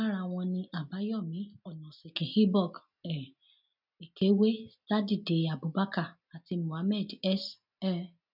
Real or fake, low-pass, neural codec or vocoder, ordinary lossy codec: real; 5.4 kHz; none; none